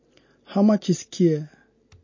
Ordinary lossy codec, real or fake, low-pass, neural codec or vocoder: MP3, 32 kbps; real; 7.2 kHz; none